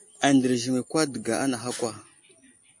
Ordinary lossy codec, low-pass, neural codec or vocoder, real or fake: MP3, 48 kbps; 10.8 kHz; none; real